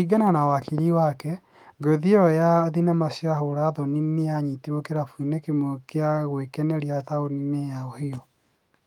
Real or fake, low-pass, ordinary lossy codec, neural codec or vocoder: fake; 19.8 kHz; Opus, 32 kbps; autoencoder, 48 kHz, 128 numbers a frame, DAC-VAE, trained on Japanese speech